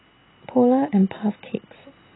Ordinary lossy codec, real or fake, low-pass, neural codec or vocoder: AAC, 16 kbps; real; 7.2 kHz; none